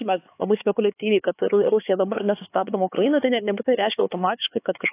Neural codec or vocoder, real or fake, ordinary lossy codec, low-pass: codec, 16 kHz, 4 kbps, X-Codec, HuBERT features, trained on LibriSpeech; fake; AAC, 24 kbps; 3.6 kHz